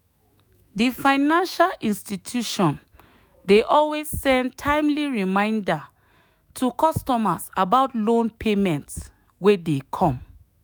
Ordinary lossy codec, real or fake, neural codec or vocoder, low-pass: none; fake; autoencoder, 48 kHz, 128 numbers a frame, DAC-VAE, trained on Japanese speech; none